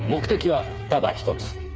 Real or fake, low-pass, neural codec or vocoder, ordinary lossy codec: fake; none; codec, 16 kHz, 4 kbps, FreqCodec, smaller model; none